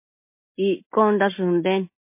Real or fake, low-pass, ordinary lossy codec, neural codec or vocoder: real; 3.6 kHz; MP3, 24 kbps; none